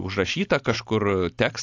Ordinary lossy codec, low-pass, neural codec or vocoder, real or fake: AAC, 48 kbps; 7.2 kHz; none; real